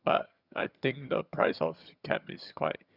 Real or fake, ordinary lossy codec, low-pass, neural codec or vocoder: fake; Opus, 64 kbps; 5.4 kHz; vocoder, 22.05 kHz, 80 mel bands, HiFi-GAN